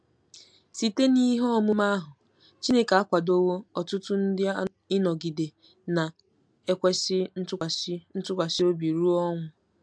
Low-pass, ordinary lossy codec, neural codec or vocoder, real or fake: 9.9 kHz; MP3, 64 kbps; none; real